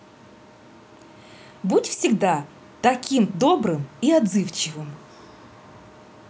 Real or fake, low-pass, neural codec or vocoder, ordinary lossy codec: real; none; none; none